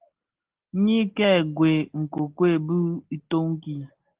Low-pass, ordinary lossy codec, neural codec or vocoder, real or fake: 3.6 kHz; Opus, 16 kbps; none; real